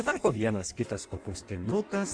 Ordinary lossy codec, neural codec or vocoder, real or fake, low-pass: Opus, 64 kbps; codec, 16 kHz in and 24 kHz out, 1.1 kbps, FireRedTTS-2 codec; fake; 9.9 kHz